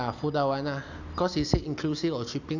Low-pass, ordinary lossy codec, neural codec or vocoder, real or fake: 7.2 kHz; none; none; real